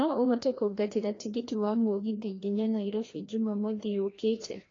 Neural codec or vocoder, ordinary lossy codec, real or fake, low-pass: codec, 16 kHz, 1 kbps, FreqCodec, larger model; AAC, 32 kbps; fake; 7.2 kHz